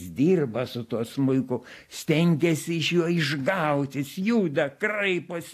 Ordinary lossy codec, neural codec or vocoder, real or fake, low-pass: AAC, 64 kbps; none; real; 14.4 kHz